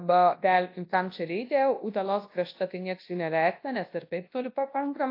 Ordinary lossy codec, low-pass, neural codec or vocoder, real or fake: AAC, 32 kbps; 5.4 kHz; codec, 24 kHz, 0.9 kbps, WavTokenizer, large speech release; fake